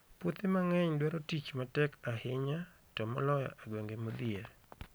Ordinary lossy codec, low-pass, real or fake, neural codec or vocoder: none; none; real; none